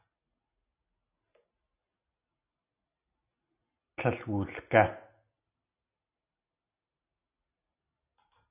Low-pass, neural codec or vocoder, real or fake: 3.6 kHz; none; real